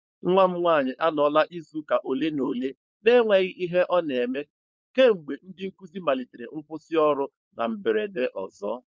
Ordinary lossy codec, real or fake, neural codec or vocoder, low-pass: none; fake; codec, 16 kHz, 4.8 kbps, FACodec; none